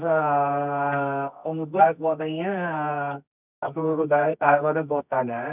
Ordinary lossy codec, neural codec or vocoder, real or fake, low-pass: none; codec, 24 kHz, 0.9 kbps, WavTokenizer, medium music audio release; fake; 3.6 kHz